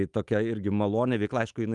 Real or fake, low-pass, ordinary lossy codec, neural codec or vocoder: fake; 10.8 kHz; Opus, 32 kbps; autoencoder, 48 kHz, 128 numbers a frame, DAC-VAE, trained on Japanese speech